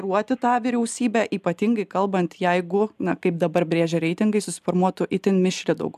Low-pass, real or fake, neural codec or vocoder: 14.4 kHz; real; none